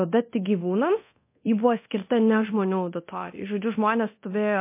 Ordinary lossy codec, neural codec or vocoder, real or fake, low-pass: MP3, 24 kbps; codec, 24 kHz, 0.9 kbps, DualCodec; fake; 3.6 kHz